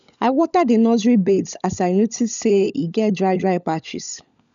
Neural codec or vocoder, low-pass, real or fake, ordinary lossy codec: codec, 16 kHz, 16 kbps, FunCodec, trained on LibriTTS, 50 frames a second; 7.2 kHz; fake; none